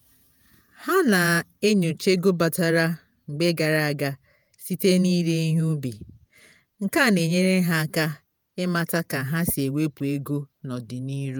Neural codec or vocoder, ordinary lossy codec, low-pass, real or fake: vocoder, 48 kHz, 128 mel bands, Vocos; none; none; fake